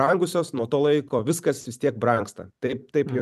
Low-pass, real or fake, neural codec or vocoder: 14.4 kHz; fake; vocoder, 44.1 kHz, 128 mel bands every 256 samples, BigVGAN v2